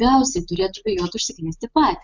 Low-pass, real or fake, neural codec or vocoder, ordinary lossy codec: 7.2 kHz; real; none; Opus, 64 kbps